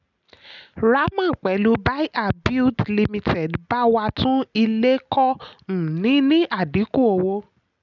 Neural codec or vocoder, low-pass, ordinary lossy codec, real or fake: none; 7.2 kHz; none; real